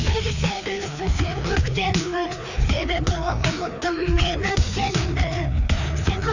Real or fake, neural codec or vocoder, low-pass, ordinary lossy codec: fake; codec, 16 kHz, 2 kbps, FreqCodec, larger model; 7.2 kHz; none